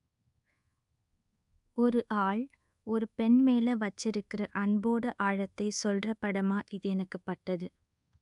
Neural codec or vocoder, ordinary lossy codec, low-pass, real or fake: codec, 24 kHz, 1.2 kbps, DualCodec; AAC, 96 kbps; 10.8 kHz; fake